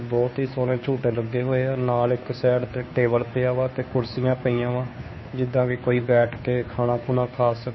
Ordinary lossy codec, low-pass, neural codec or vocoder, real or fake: MP3, 24 kbps; 7.2 kHz; codec, 16 kHz, 2 kbps, FunCodec, trained on Chinese and English, 25 frames a second; fake